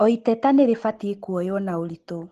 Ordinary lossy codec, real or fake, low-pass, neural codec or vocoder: Opus, 16 kbps; real; 14.4 kHz; none